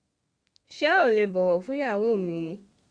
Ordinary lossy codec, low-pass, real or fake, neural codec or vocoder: Opus, 64 kbps; 9.9 kHz; fake; codec, 32 kHz, 1.9 kbps, SNAC